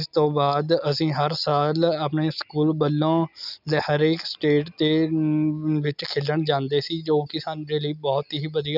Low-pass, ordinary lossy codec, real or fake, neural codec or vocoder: 5.4 kHz; none; real; none